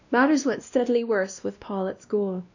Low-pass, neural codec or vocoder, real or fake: 7.2 kHz; codec, 16 kHz, 1 kbps, X-Codec, WavLM features, trained on Multilingual LibriSpeech; fake